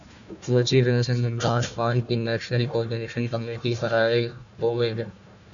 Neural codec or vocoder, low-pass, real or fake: codec, 16 kHz, 1 kbps, FunCodec, trained on Chinese and English, 50 frames a second; 7.2 kHz; fake